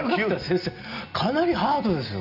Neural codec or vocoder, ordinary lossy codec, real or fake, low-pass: none; none; real; 5.4 kHz